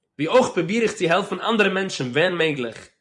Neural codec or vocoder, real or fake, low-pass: vocoder, 24 kHz, 100 mel bands, Vocos; fake; 10.8 kHz